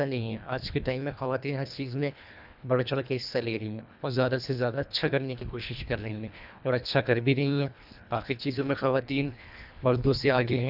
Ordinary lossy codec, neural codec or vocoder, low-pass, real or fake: none; codec, 24 kHz, 1.5 kbps, HILCodec; 5.4 kHz; fake